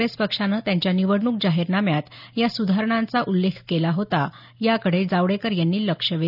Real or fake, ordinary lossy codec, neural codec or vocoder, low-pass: real; none; none; 5.4 kHz